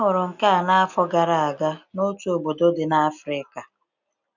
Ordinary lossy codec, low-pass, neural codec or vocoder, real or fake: none; 7.2 kHz; none; real